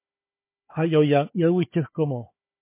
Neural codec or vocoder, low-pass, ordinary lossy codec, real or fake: codec, 16 kHz, 4 kbps, FunCodec, trained on Chinese and English, 50 frames a second; 3.6 kHz; MP3, 24 kbps; fake